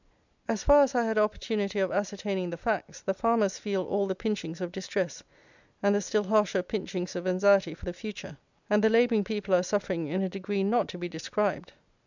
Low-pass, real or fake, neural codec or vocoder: 7.2 kHz; real; none